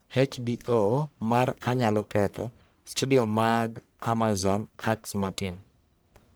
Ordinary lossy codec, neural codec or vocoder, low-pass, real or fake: none; codec, 44.1 kHz, 1.7 kbps, Pupu-Codec; none; fake